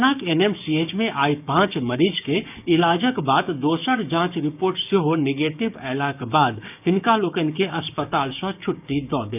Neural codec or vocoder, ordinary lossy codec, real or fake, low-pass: codec, 16 kHz, 6 kbps, DAC; none; fake; 3.6 kHz